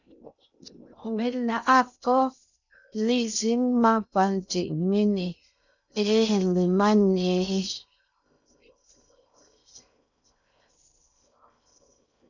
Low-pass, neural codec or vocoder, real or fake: 7.2 kHz; codec, 16 kHz in and 24 kHz out, 0.6 kbps, FocalCodec, streaming, 2048 codes; fake